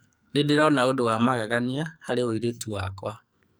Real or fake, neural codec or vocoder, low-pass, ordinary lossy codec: fake; codec, 44.1 kHz, 2.6 kbps, SNAC; none; none